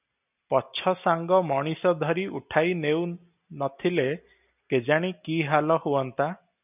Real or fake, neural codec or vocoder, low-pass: real; none; 3.6 kHz